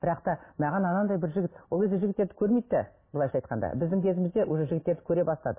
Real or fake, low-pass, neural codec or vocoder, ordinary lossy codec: real; 3.6 kHz; none; MP3, 16 kbps